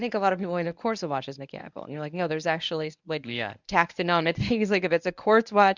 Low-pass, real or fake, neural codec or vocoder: 7.2 kHz; fake; codec, 24 kHz, 0.9 kbps, WavTokenizer, medium speech release version 1